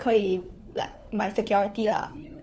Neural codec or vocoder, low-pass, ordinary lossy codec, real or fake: codec, 16 kHz, 4 kbps, FunCodec, trained on LibriTTS, 50 frames a second; none; none; fake